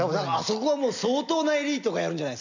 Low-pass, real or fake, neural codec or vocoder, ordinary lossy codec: 7.2 kHz; real; none; none